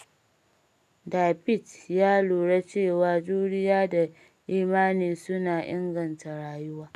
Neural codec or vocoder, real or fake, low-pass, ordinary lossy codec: none; real; 14.4 kHz; none